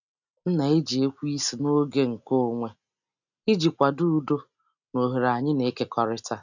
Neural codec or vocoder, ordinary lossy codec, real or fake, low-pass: none; none; real; 7.2 kHz